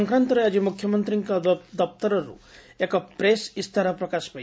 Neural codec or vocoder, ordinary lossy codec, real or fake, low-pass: none; none; real; none